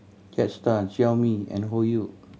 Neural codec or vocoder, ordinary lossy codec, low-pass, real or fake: none; none; none; real